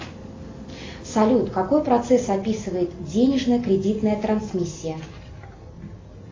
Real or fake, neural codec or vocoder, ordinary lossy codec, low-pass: real; none; AAC, 32 kbps; 7.2 kHz